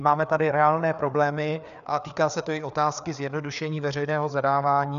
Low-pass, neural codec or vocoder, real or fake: 7.2 kHz; codec, 16 kHz, 4 kbps, FreqCodec, larger model; fake